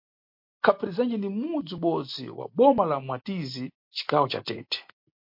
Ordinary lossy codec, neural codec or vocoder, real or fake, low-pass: MP3, 32 kbps; none; real; 5.4 kHz